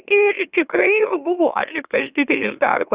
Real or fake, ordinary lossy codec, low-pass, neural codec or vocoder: fake; Opus, 64 kbps; 3.6 kHz; autoencoder, 44.1 kHz, a latent of 192 numbers a frame, MeloTTS